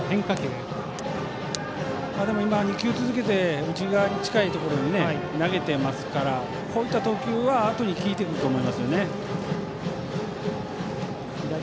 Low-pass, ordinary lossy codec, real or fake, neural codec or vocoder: none; none; real; none